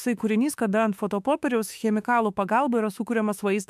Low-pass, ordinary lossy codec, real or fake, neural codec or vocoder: 14.4 kHz; MP3, 96 kbps; fake; autoencoder, 48 kHz, 32 numbers a frame, DAC-VAE, trained on Japanese speech